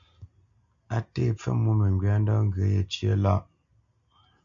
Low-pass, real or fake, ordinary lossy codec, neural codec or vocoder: 7.2 kHz; real; AAC, 64 kbps; none